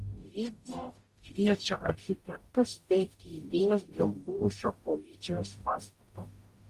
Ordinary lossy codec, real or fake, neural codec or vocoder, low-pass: Opus, 32 kbps; fake; codec, 44.1 kHz, 0.9 kbps, DAC; 14.4 kHz